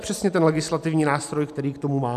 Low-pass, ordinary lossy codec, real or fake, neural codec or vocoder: 14.4 kHz; AAC, 96 kbps; real; none